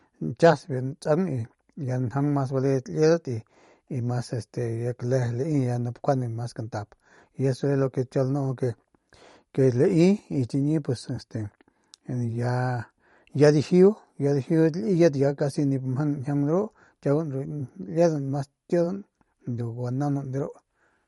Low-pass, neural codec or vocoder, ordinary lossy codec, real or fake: 19.8 kHz; vocoder, 44.1 kHz, 128 mel bands every 512 samples, BigVGAN v2; MP3, 48 kbps; fake